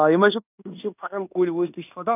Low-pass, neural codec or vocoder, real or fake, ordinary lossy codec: 3.6 kHz; codec, 16 kHz in and 24 kHz out, 0.9 kbps, LongCat-Audio-Codec, fine tuned four codebook decoder; fake; none